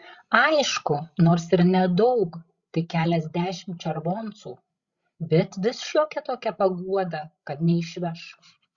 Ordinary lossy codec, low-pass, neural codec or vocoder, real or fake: Opus, 64 kbps; 7.2 kHz; codec, 16 kHz, 16 kbps, FreqCodec, larger model; fake